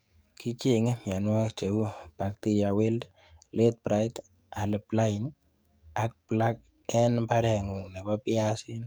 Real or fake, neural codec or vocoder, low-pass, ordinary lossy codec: fake; codec, 44.1 kHz, 7.8 kbps, DAC; none; none